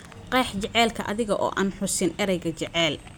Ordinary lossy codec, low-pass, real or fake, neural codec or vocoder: none; none; real; none